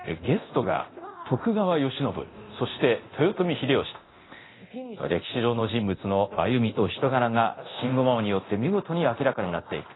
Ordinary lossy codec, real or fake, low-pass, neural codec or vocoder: AAC, 16 kbps; fake; 7.2 kHz; codec, 24 kHz, 0.9 kbps, DualCodec